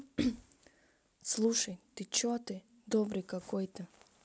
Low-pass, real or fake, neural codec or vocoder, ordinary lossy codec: none; real; none; none